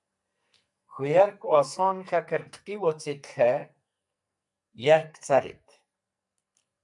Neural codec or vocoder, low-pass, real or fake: codec, 44.1 kHz, 2.6 kbps, SNAC; 10.8 kHz; fake